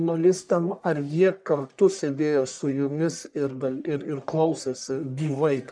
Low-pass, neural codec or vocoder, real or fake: 9.9 kHz; codec, 44.1 kHz, 1.7 kbps, Pupu-Codec; fake